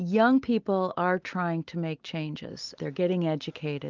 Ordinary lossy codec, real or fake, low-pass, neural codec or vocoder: Opus, 24 kbps; real; 7.2 kHz; none